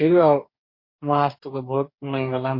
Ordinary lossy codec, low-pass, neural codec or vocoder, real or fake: MP3, 32 kbps; 5.4 kHz; codec, 44.1 kHz, 2.6 kbps, DAC; fake